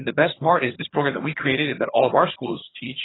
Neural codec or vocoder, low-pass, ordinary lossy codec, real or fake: vocoder, 22.05 kHz, 80 mel bands, HiFi-GAN; 7.2 kHz; AAC, 16 kbps; fake